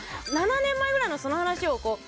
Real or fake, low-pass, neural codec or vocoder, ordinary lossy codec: real; none; none; none